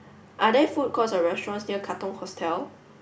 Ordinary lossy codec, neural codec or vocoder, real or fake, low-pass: none; none; real; none